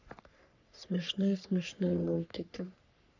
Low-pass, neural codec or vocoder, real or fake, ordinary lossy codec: 7.2 kHz; codec, 44.1 kHz, 3.4 kbps, Pupu-Codec; fake; none